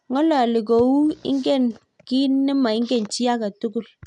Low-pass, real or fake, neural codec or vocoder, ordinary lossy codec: 10.8 kHz; real; none; none